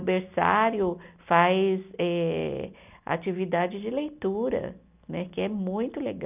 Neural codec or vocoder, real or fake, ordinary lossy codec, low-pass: none; real; none; 3.6 kHz